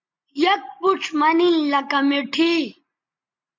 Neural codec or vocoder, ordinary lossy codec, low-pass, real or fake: none; MP3, 64 kbps; 7.2 kHz; real